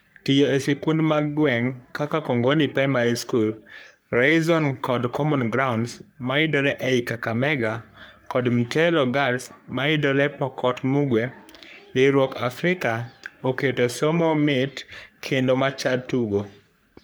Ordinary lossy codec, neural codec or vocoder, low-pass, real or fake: none; codec, 44.1 kHz, 3.4 kbps, Pupu-Codec; none; fake